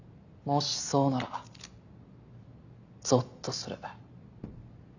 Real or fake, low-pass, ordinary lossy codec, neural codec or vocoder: real; 7.2 kHz; none; none